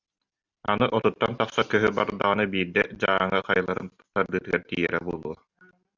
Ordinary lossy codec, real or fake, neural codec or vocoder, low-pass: Opus, 64 kbps; real; none; 7.2 kHz